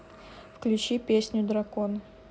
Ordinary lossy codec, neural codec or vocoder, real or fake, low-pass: none; none; real; none